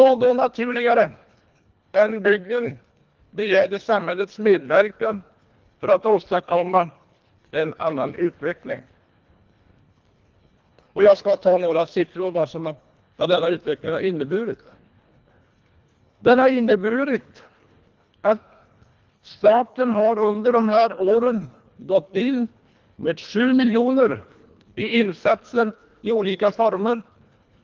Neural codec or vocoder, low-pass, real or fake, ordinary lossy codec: codec, 24 kHz, 1.5 kbps, HILCodec; 7.2 kHz; fake; Opus, 32 kbps